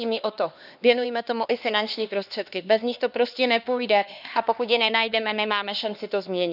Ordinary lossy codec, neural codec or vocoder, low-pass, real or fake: none; codec, 16 kHz, 2 kbps, X-Codec, HuBERT features, trained on LibriSpeech; 5.4 kHz; fake